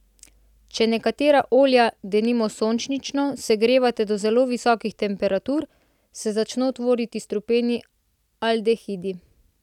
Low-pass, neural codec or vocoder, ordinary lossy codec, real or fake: 19.8 kHz; none; none; real